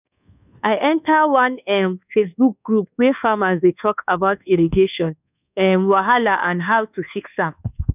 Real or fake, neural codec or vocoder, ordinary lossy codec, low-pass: fake; codec, 24 kHz, 1.2 kbps, DualCodec; none; 3.6 kHz